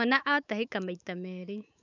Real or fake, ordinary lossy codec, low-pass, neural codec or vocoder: fake; none; 7.2 kHz; autoencoder, 48 kHz, 128 numbers a frame, DAC-VAE, trained on Japanese speech